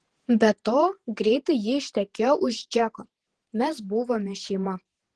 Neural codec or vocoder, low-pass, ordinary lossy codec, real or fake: vocoder, 48 kHz, 128 mel bands, Vocos; 10.8 kHz; Opus, 16 kbps; fake